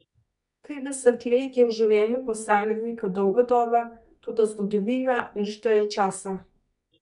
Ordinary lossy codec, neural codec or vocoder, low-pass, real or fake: none; codec, 24 kHz, 0.9 kbps, WavTokenizer, medium music audio release; 10.8 kHz; fake